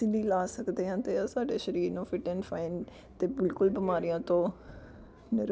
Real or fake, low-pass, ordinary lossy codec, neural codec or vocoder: real; none; none; none